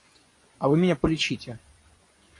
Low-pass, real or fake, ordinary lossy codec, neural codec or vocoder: 10.8 kHz; fake; AAC, 48 kbps; vocoder, 24 kHz, 100 mel bands, Vocos